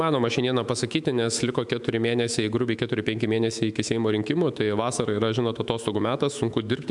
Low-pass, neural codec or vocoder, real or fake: 10.8 kHz; autoencoder, 48 kHz, 128 numbers a frame, DAC-VAE, trained on Japanese speech; fake